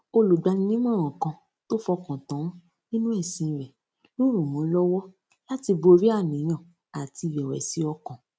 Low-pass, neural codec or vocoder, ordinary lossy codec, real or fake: none; none; none; real